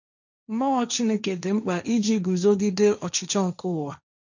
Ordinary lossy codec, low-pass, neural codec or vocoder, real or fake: none; 7.2 kHz; codec, 16 kHz, 1.1 kbps, Voila-Tokenizer; fake